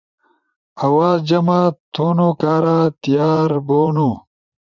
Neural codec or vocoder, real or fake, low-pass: vocoder, 44.1 kHz, 80 mel bands, Vocos; fake; 7.2 kHz